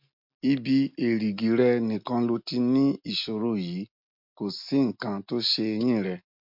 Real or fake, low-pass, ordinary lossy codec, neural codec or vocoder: real; 5.4 kHz; MP3, 48 kbps; none